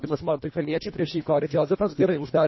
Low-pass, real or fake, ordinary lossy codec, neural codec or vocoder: 7.2 kHz; fake; MP3, 24 kbps; codec, 24 kHz, 1.5 kbps, HILCodec